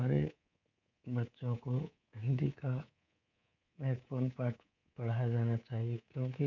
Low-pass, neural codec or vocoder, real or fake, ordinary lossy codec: 7.2 kHz; codec, 24 kHz, 3.1 kbps, DualCodec; fake; none